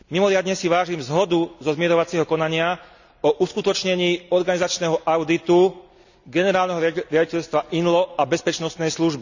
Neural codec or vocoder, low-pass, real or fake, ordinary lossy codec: none; 7.2 kHz; real; none